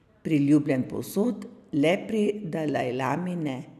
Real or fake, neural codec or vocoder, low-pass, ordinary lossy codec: real; none; 14.4 kHz; none